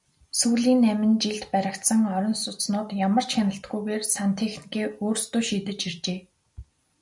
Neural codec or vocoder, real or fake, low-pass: none; real; 10.8 kHz